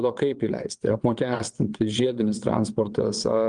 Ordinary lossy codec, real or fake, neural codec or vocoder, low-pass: Opus, 32 kbps; fake; vocoder, 22.05 kHz, 80 mel bands, WaveNeXt; 9.9 kHz